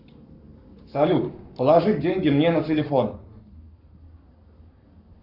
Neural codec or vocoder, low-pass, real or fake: codec, 44.1 kHz, 7.8 kbps, Pupu-Codec; 5.4 kHz; fake